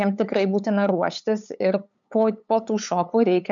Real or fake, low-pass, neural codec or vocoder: fake; 7.2 kHz; codec, 16 kHz, 8 kbps, FunCodec, trained on LibriTTS, 25 frames a second